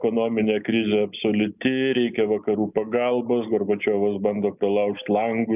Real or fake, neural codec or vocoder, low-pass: real; none; 3.6 kHz